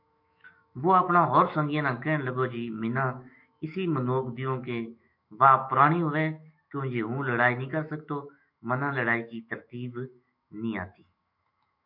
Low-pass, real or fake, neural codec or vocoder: 5.4 kHz; fake; autoencoder, 48 kHz, 128 numbers a frame, DAC-VAE, trained on Japanese speech